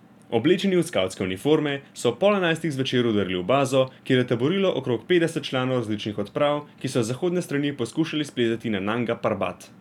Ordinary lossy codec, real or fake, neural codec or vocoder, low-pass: none; real; none; 19.8 kHz